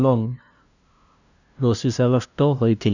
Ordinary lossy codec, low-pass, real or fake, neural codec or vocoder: none; 7.2 kHz; fake; codec, 16 kHz, 0.5 kbps, FunCodec, trained on LibriTTS, 25 frames a second